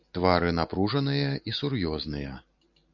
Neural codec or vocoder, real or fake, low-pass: none; real; 7.2 kHz